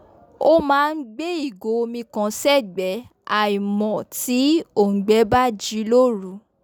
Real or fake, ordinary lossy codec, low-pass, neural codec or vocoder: real; none; none; none